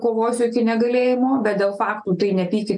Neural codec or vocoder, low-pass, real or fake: none; 10.8 kHz; real